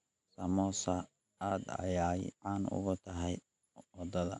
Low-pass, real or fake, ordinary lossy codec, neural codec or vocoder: 10.8 kHz; real; AAC, 64 kbps; none